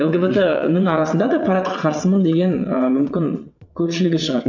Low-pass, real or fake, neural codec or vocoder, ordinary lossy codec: 7.2 kHz; fake; vocoder, 22.05 kHz, 80 mel bands, WaveNeXt; none